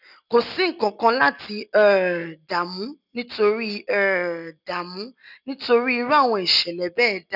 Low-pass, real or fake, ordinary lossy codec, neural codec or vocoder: 5.4 kHz; real; none; none